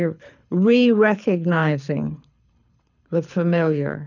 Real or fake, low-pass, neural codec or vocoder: fake; 7.2 kHz; codec, 24 kHz, 6 kbps, HILCodec